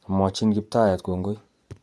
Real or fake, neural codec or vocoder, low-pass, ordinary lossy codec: fake; vocoder, 24 kHz, 100 mel bands, Vocos; none; none